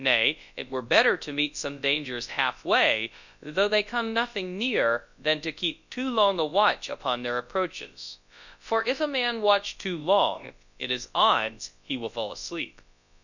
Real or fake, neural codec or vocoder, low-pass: fake; codec, 24 kHz, 0.9 kbps, WavTokenizer, large speech release; 7.2 kHz